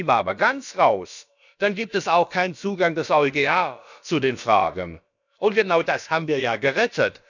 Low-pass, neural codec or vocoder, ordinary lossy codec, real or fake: 7.2 kHz; codec, 16 kHz, about 1 kbps, DyCAST, with the encoder's durations; none; fake